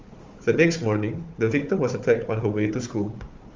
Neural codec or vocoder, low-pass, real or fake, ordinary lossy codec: codec, 16 kHz, 4 kbps, FunCodec, trained on Chinese and English, 50 frames a second; 7.2 kHz; fake; Opus, 32 kbps